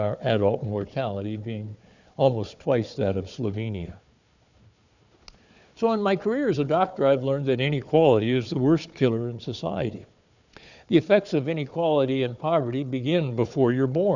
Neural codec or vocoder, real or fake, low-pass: codec, 16 kHz, 4 kbps, FunCodec, trained on Chinese and English, 50 frames a second; fake; 7.2 kHz